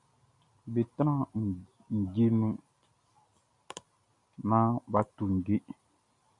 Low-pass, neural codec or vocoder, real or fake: 10.8 kHz; none; real